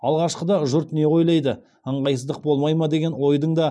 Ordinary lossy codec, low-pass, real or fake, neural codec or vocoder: none; none; real; none